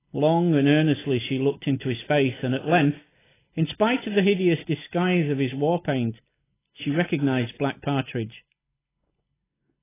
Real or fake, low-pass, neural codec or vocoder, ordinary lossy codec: real; 3.6 kHz; none; AAC, 16 kbps